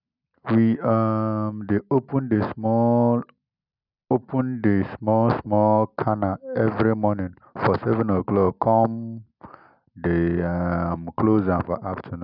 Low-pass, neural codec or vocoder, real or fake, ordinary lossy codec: 5.4 kHz; none; real; none